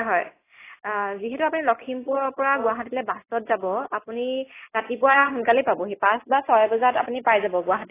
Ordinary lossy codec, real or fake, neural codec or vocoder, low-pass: AAC, 16 kbps; real; none; 3.6 kHz